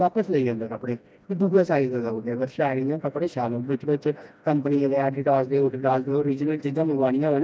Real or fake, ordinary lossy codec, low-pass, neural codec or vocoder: fake; none; none; codec, 16 kHz, 1 kbps, FreqCodec, smaller model